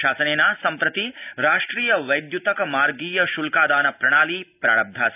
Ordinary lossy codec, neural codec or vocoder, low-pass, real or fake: none; none; 3.6 kHz; real